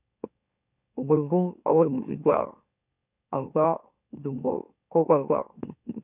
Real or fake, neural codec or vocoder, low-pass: fake; autoencoder, 44.1 kHz, a latent of 192 numbers a frame, MeloTTS; 3.6 kHz